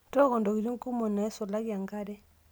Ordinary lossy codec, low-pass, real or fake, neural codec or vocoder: none; none; real; none